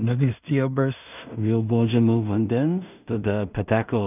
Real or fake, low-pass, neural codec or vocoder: fake; 3.6 kHz; codec, 16 kHz in and 24 kHz out, 0.4 kbps, LongCat-Audio-Codec, two codebook decoder